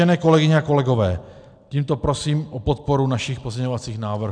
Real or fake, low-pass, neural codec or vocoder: real; 9.9 kHz; none